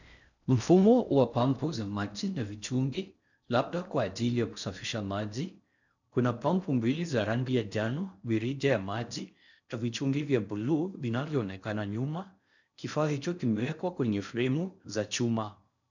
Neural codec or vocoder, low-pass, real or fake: codec, 16 kHz in and 24 kHz out, 0.6 kbps, FocalCodec, streaming, 4096 codes; 7.2 kHz; fake